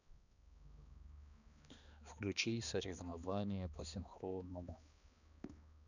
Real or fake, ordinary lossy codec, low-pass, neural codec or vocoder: fake; none; 7.2 kHz; codec, 16 kHz, 2 kbps, X-Codec, HuBERT features, trained on balanced general audio